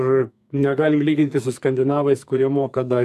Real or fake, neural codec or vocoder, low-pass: fake; codec, 44.1 kHz, 2.6 kbps, SNAC; 14.4 kHz